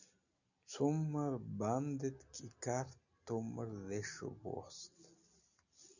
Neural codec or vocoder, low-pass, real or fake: none; 7.2 kHz; real